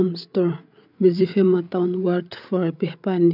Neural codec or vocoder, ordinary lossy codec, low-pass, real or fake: codec, 16 kHz, 8 kbps, FreqCodec, larger model; none; 5.4 kHz; fake